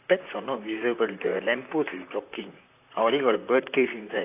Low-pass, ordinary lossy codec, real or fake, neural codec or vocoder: 3.6 kHz; AAC, 24 kbps; fake; vocoder, 44.1 kHz, 128 mel bands, Pupu-Vocoder